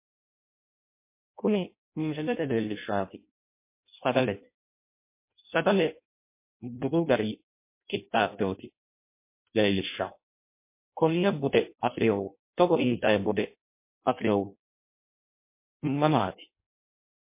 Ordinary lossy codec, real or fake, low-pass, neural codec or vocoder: MP3, 24 kbps; fake; 3.6 kHz; codec, 16 kHz in and 24 kHz out, 0.6 kbps, FireRedTTS-2 codec